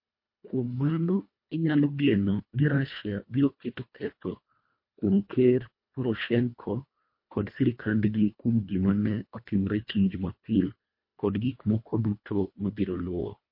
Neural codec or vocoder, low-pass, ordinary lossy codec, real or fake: codec, 24 kHz, 1.5 kbps, HILCodec; 5.4 kHz; MP3, 32 kbps; fake